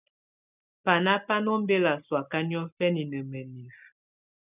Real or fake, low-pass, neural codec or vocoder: real; 3.6 kHz; none